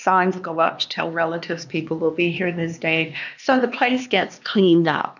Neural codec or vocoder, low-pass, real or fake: codec, 16 kHz, 2 kbps, X-Codec, HuBERT features, trained on LibriSpeech; 7.2 kHz; fake